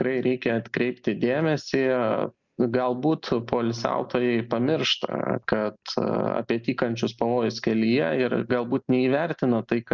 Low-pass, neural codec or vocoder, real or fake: 7.2 kHz; none; real